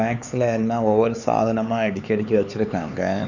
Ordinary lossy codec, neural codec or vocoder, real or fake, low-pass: none; codec, 16 kHz, 4 kbps, X-Codec, HuBERT features, trained on LibriSpeech; fake; 7.2 kHz